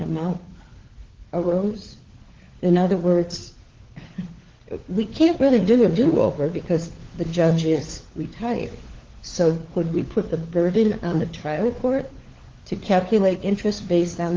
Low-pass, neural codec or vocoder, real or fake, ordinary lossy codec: 7.2 kHz; codec, 16 kHz, 4 kbps, FunCodec, trained on LibriTTS, 50 frames a second; fake; Opus, 16 kbps